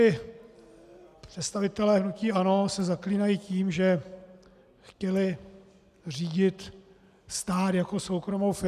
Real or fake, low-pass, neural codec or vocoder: real; 14.4 kHz; none